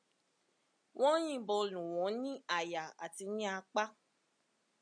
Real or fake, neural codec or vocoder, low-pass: real; none; 9.9 kHz